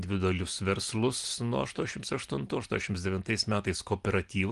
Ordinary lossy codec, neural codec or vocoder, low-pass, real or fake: Opus, 24 kbps; none; 10.8 kHz; real